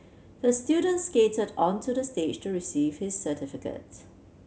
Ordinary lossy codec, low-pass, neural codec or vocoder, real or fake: none; none; none; real